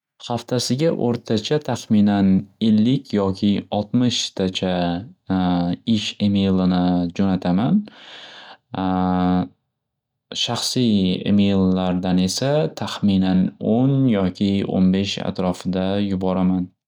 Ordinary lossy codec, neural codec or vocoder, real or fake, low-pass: none; none; real; 19.8 kHz